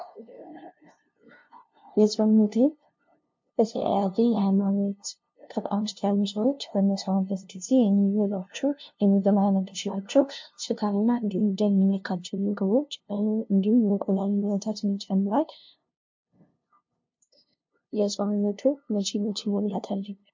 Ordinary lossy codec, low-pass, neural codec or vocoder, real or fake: MP3, 48 kbps; 7.2 kHz; codec, 16 kHz, 0.5 kbps, FunCodec, trained on LibriTTS, 25 frames a second; fake